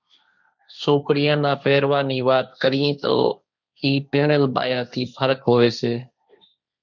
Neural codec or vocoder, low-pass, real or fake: codec, 16 kHz, 1.1 kbps, Voila-Tokenizer; 7.2 kHz; fake